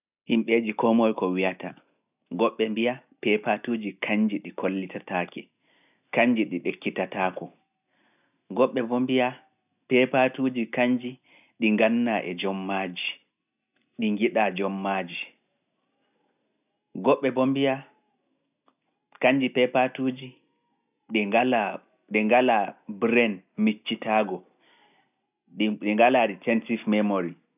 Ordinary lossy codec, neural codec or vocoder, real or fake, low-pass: none; none; real; 3.6 kHz